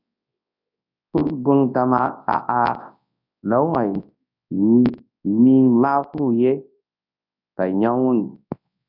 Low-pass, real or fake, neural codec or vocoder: 5.4 kHz; fake; codec, 24 kHz, 0.9 kbps, WavTokenizer, large speech release